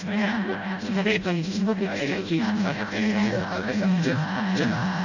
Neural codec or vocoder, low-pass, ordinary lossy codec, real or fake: codec, 16 kHz, 0.5 kbps, FreqCodec, smaller model; 7.2 kHz; none; fake